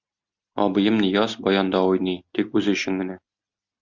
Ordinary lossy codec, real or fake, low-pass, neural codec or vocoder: Opus, 64 kbps; real; 7.2 kHz; none